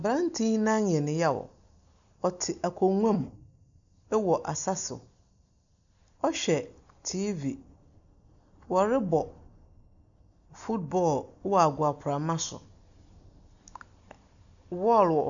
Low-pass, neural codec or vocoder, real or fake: 7.2 kHz; none; real